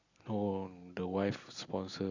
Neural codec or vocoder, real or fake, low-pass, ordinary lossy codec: none; real; 7.2 kHz; Opus, 64 kbps